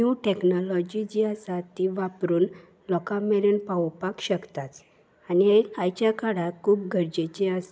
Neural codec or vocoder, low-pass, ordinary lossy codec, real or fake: none; none; none; real